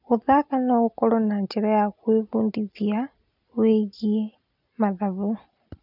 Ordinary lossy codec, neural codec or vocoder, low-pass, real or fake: none; none; 5.4 kHz; real